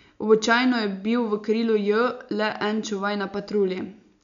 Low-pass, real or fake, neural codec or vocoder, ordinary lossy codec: 7.2 kHz; real; none; none